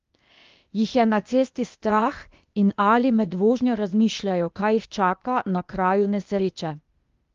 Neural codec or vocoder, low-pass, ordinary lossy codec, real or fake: codec, 16 kHz, 0.8 kbps, ZipCodec; 7.2 kHz; Opus, 32 kbps; fake